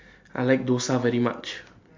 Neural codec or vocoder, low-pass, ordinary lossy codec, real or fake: none; 7.2 kHz; MP3, 48 kbps; real